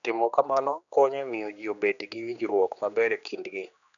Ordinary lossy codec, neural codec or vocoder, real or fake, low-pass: none; codec, 16 kHz, 2 kbps, X-Codec, HuBERT features, trained on general audio; fake; 7.2 kHz